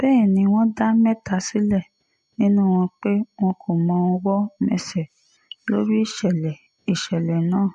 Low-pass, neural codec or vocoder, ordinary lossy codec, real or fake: 14.4 kHz; none; MP3, 48 kbps; real